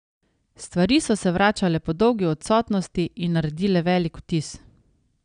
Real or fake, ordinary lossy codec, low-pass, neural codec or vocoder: real; none; 9.9 kHz; none